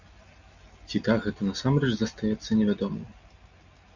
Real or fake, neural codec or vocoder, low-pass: real; none; 7.2 kHz